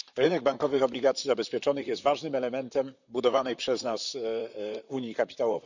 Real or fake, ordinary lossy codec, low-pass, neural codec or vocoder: fake; none; 7.2 kHz; vocoder, 44.1 kHz, 128 mel bands, Pupu-Vocoder